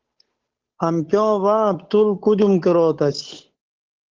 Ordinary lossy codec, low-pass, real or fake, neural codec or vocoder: Opus, 16 kbps; 7.2 kHz; fake; codec, 16 kHz, 8 kbps, FunCodec, trained on Chinese and English, 25 frames a second